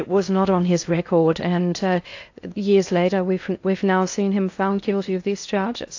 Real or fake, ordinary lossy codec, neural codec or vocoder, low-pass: fake; AAC, 48 kbps; codec, 16 kHz in and 24 kHz out, 0.6 kbps, FocalCodec, streaming, 4096 codes; 7.2 kHz